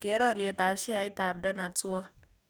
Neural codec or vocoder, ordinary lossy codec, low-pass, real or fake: codec, 44.1 kHz, 2.6 kbps, DAC; none; none; fake